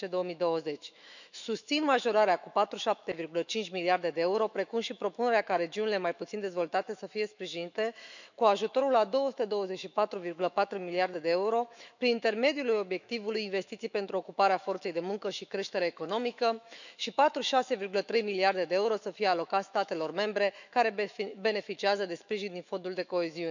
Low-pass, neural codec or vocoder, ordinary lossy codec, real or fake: 7.2 kHz; autoencoder, 48 kHz, 128 numbers a frame, DAC-VAE, trained on Japanese speech; none; fake